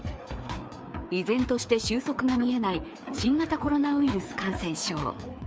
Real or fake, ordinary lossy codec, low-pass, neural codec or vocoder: fake; none; none; codec, 16 kHz, 4 kbps, FreqCodec, larger model